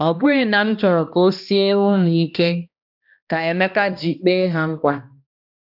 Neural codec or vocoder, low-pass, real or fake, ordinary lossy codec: codec, 16 kHz, 1 kbps, X-Codec, HuBERT features, trained on balanced general audio; 5.4 kHz; fake; none